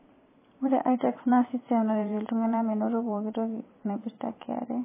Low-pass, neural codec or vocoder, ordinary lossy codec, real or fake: 3.6 kHz; vocoder, 44.1 kHz, 128 mel bands every 512 samples, BigVGAN v2; MP3, 16 kbps; fake